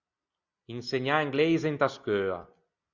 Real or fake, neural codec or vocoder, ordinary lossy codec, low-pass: real; none; Opus, 64 kbps; 7.2 kHz